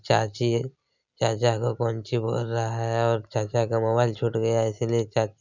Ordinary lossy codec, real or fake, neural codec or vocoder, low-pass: none; real; none; 7.2 kHz